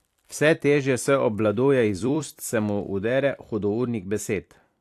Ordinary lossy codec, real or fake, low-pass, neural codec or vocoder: AAC, 64 kbps; fake; 14.4 kHz; vocoder, 44.1 kHz, 128 mel bands every 256 samples, BigVGAN v2